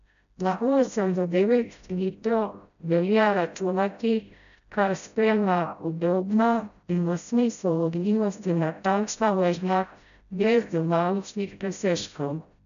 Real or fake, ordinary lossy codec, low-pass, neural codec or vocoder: fake; none; 7.2 kHz; codec, 16 kHz, 0.5 kbps, FreqCodec, smaller model